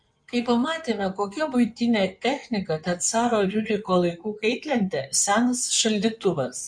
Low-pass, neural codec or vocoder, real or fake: 9.9 kHz; codec, 16 kHz in and 24 kHz out, 2.2 kbps, FireRedTTS-2 codec; fake